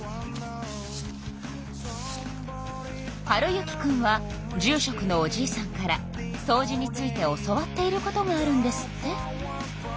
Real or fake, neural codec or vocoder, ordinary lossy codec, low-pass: real; none; none; none